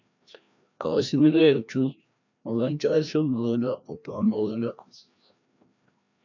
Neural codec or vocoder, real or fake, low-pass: codec, 16 kHz, 1 kbps, FreqCodec, larger model; fake; 7.2 kHz